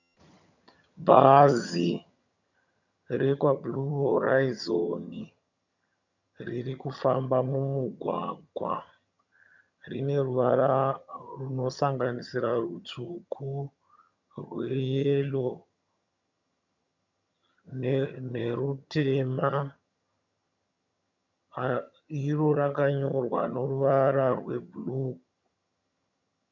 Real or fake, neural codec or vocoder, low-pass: fake; vocoder, 22.05 kHz, 80 mel bands, HiFi-GAN; 7.2 kHz